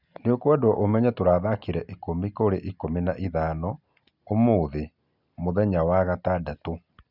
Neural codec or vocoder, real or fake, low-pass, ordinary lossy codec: none; real; 5.4 kHz; none